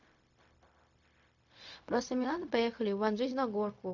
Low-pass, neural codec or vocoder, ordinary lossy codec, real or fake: 7.2 kHz; codec, 16 kHz, 0.4 kbps, LongCat-Audio-Codec; AAC, 48 kbps; fake